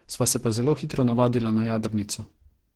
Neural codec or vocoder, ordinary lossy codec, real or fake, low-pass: codec, 44.1 kHz, 2.6 kbps, DAC; Opus, 16 kbps; fake; 19.8 kHz